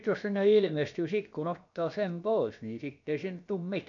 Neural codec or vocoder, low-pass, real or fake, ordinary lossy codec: codec, 16 kHz, about 1 kbps, DyCAST, with the encoder's durations; 7.2 kHz; fake; none